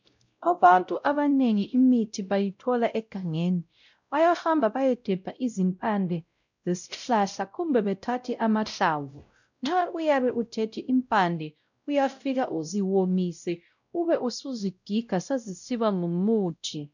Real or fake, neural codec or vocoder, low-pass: fake; codec, 16 kHz, 0.5 kbps, X-Codec, WavLM features, trained on Multilingual LibriSpeech; 7.2 kHz